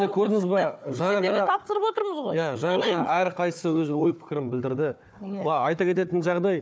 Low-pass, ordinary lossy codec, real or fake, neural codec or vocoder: none; none; fake; codec, 16 kHz, 4 kbps, FunCodec, trained on Chinese and English, 50 frames a second